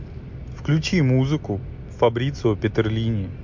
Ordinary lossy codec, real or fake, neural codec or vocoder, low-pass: MP3, 48 kbps; real; none; 7.2 kHz